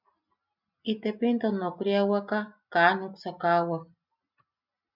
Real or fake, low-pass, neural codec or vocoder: real; 5.4 kHz; none